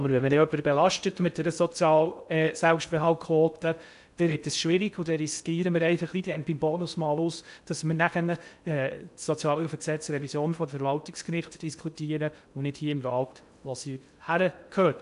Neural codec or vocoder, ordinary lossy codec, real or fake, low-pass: codec, 16 kHz in and 24 kHz out, 0.6 kbps, FocalCodec, streaming, 2048 codes; none; fake; 10.8 kHz